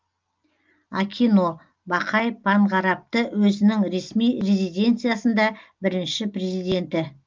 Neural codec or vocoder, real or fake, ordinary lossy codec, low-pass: none; real; Opus, 24 kbps; 7.2 kHz